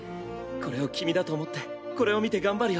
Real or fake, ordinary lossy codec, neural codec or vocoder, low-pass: real; none; none; none